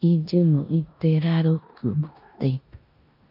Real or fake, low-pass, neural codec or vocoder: fake; 5.4 kHz; codec, 16 kHz in and 24 kHz out, 0.9 kbps, LongCat-Audio-Codec, four codebook decoder